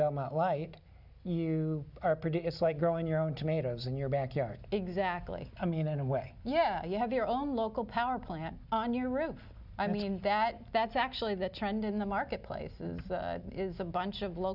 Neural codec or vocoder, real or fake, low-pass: none; real; 5.4 kHz